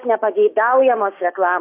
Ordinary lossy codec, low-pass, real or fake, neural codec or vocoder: AAC, 24 kbps; 3.6 kHz; fake; codec, 16 kHz, 6 kbps, DAC